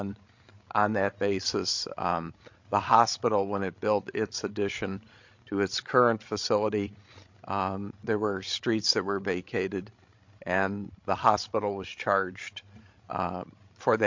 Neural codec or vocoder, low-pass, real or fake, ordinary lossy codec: codec, 16 kHz, 16 kbps, FreqCodec, larger model; 7.2 kHz; fake; MP3, 48 kbps